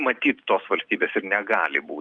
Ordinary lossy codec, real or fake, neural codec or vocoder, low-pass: Opus, 32 kbps; real; none; 9.9 kHz